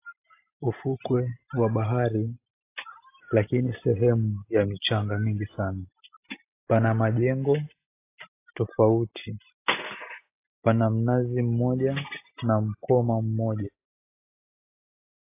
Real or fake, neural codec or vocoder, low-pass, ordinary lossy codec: real; none; 3.6 kHz; AAC, 24 kbps